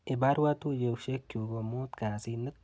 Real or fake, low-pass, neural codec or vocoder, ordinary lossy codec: real; none; none; none